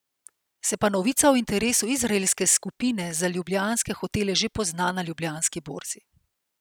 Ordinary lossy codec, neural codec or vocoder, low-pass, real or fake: none; none; none; real